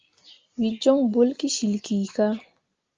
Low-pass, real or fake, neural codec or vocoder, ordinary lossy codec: 7.2 kHz; real; none; Opus, 32 kbps